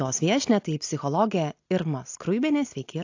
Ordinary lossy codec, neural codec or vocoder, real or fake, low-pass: AAC, 48 kbps; none; real; 7.2 kHz